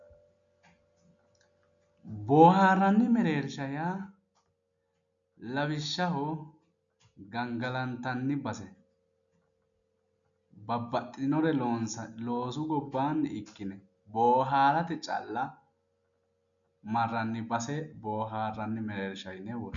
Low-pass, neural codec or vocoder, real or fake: 7.2 kHz; none; real